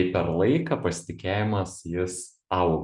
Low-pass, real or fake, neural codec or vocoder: 10.8 kHz; real; none